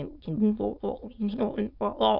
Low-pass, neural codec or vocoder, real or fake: 5.4 kHz; autoencoder, 22.05 kHz, a latent of 192 numbers a frame, VITS, trained on many speakers; fake